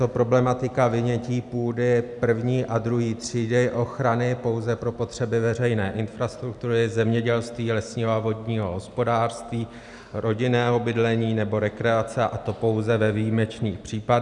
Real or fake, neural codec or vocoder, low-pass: real; none; 10.8 kHz